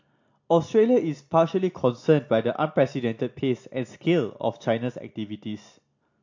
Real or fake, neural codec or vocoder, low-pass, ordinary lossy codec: real; none; 7.2 kHz; AAC, 48 kbps